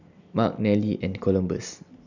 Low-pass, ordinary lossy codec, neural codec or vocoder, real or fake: 7.2 kHz; none; none; real